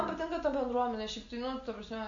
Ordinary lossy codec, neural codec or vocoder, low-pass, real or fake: MP3, 64 kbps; none; 7.2 kHz; real